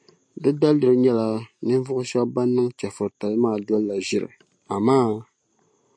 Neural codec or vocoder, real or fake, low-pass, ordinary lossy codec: none; real; 9.9 kHz; MP3, 48 kbps